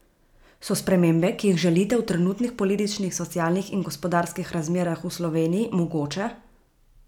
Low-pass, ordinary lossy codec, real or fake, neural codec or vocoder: 19.8 kHz; none; real; none